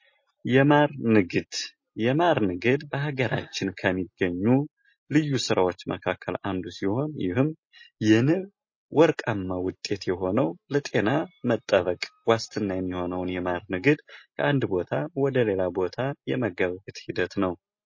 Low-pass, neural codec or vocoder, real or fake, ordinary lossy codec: 7.2 kHz; none; real; MP3, 32 kbps